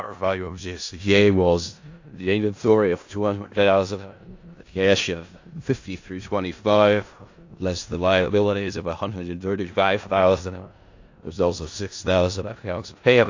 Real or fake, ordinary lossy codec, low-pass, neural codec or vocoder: fake; AAC, 48 kbps; 7.2 kHz; codec, 16 kHz in and 24 kHz out, 0.4 kbps, LongCat-Audio-Codec, four codebook decoder